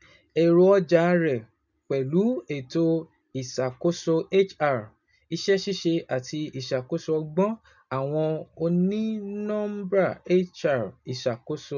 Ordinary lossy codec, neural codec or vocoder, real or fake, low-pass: none; none; real; 7.2 kHz